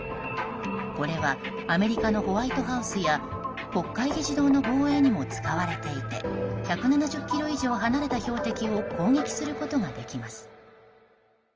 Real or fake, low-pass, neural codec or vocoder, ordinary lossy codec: real; 7.2 kHz; none; Opus, 24 kbps